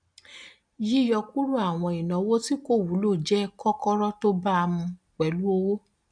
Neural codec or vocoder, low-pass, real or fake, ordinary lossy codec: none; 9.9 kHz; real; none